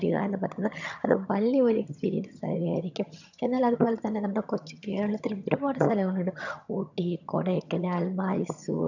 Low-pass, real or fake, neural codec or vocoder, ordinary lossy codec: 7.2 kHz; fake; vocoder, 22.05 kHz, 80 mel bands, HiFi-GAN; none